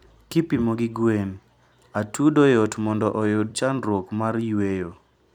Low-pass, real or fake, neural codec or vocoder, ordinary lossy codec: 19.8 kHz; fake; vocoder, 44.1 kHz, 128 mel bands every 256 samples, BigVGAN v2; none